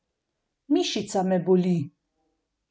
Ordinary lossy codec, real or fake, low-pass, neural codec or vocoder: none; real; none; none